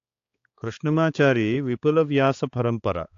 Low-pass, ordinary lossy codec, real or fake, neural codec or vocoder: 7.2 kHz; AAC, 48 kbps; fake; codec, 16 kHz, 4 kbps, X-Codec, HuBERT features, trained on balanced general audio